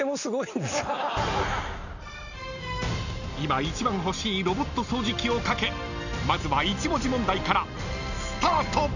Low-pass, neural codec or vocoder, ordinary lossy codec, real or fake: 7.2 kHz; none; none; real